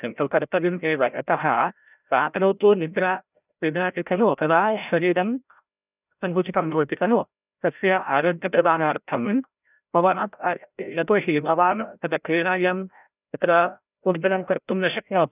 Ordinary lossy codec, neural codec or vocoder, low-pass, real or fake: none; codec, 16 kHz, 0.5 kbps, FreqCodec, larger model; 3.6 kHz; fake